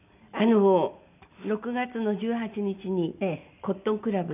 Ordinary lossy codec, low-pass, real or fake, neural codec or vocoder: none; 3.6 kHz; real; none